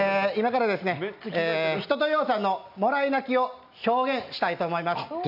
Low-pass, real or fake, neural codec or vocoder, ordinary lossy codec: 5.4 kHz; real; none; none